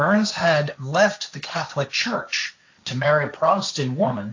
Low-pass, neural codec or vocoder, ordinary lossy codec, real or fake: 7.2 kHz; codec, 16 kHz, 1.1 kbps, Voila-Tokenizer; AAC, 48 kbps; fake